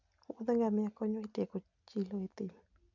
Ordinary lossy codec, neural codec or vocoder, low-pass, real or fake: none; none; 7.2 kHz; real